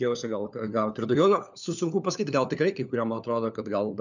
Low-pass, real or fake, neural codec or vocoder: 7.2 kHz; fake; codec, 16 kHz, 4 kbps, FunCodec, trained on LibriTTS, 50 frames a second